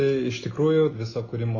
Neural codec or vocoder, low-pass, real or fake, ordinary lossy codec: none; 7.2 kHz; real; AAC, 32 kbps